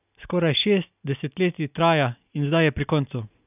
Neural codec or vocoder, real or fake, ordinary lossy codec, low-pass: none; real; none; 3.6 kHz